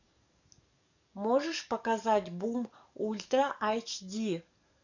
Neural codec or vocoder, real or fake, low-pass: codec, 44.1 kHz, 7.8 kbps, DAC; fake; 7.2 kHz